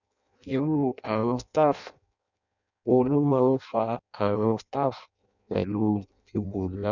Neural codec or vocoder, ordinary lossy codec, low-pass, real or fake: codec, 16 kHz in and 24 kHz out, 0.6 kbps, FireRedTTS-2 codec; none; 7.2 kHz; fake